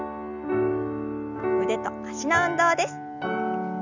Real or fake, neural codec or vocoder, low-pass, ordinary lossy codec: real; none; 7.2 kHz; none